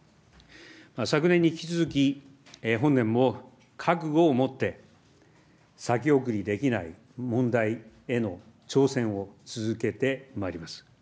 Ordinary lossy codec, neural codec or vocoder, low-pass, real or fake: none; none; none; real